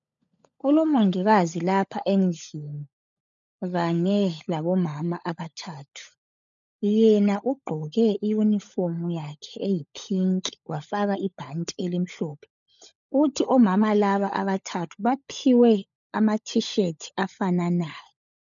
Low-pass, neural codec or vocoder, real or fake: 7.2 kHz; codec, 16 kHz, 16 kbps, FunCodec, trained on LibriTTS, 50 frames a second; fake